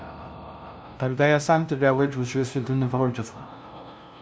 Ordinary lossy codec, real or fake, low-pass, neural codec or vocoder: none; fake; none; codec, 16 kHz, 0.5 kbps, FunCodec, trained on LibriTTS, 25 frames a second